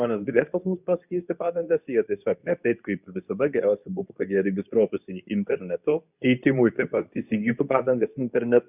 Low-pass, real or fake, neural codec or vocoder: 3.6 kHz; fake; codec, 24 kHz, 0.9 kbps, WavTokenizer, medium speech release version 2